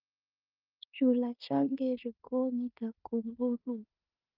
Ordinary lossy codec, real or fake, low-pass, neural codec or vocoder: Opus, 24 kbps; fake; 5.4 kHz; codec, 16 kHz in and 24 kHz out, 0.9 kbps, LongCat-Audio-Codec, four codebook decoder